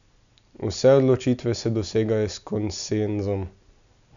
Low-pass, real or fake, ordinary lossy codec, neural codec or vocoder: 7.2 kHz; real; MP3, 96 kbps; none